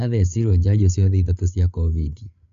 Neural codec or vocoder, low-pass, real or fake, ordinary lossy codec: codec, 16 kHz, 8 kbps, FreqCodec, larger model; 7.2 kHz; fake; MP3, 48 kbps